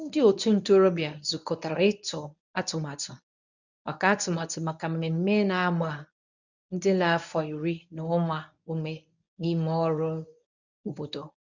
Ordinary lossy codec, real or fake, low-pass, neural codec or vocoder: none; fake; 7.2 kHz; codec, 24 kHz, 0.9 kbps, WavTokenizer, medium speech release version 1